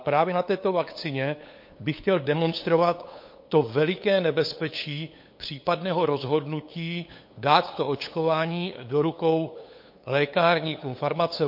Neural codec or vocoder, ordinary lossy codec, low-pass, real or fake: codec, 16 kHz, 8 kbps, FunCodec, trained on LibriTTS, 25 frames a second; MP3, 32 kbps; 5.4 kHz; fake